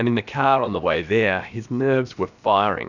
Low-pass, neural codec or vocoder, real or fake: 7.2 kHz; codec, 16 kHz, about 1 kbps, DyCAST, with the encoder's durations; fake